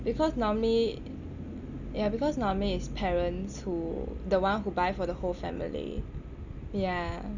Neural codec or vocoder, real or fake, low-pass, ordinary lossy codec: none; real; 7.2 kHz; none